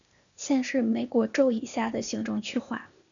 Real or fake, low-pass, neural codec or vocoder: fake; 7.2 kHz; codec, 16 kHz, 0.8 kbps, ZipCodec